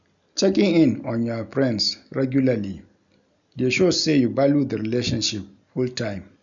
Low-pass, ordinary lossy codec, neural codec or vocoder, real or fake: 7.2 kHz; none; none; real